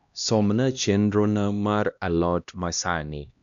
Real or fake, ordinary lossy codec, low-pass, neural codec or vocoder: fake; none; 7.2 kHz; codec, 16 kHz, 1 kbps, X-Codec, HuBERT features, trained on LibriSpeech